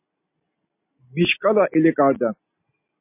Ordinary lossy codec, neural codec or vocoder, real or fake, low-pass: MP3, 24 kbps; none; real; 3.6 kHz